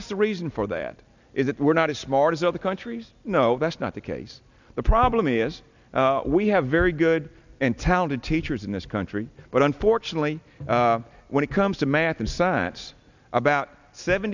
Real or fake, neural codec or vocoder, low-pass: real; none; 7.2 kHz